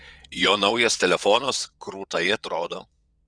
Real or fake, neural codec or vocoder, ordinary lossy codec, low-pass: fake; vocoder, 22.05 kHz, 80 mel bands, WaveNeXt; Opus, 64 kbps; 9.9 kHz